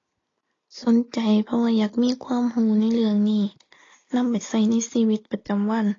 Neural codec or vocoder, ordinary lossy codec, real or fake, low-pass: none; AAC, 32 kbps; real; 7.2 kHz